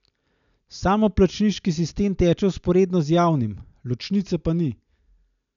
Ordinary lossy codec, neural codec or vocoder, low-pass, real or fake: none; none; 7.2 kHz; real